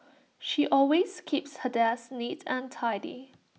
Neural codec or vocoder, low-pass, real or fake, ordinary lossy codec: none; none; real; none